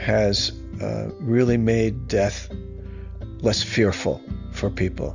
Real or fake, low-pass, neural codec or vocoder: real; 7.2 kHz; none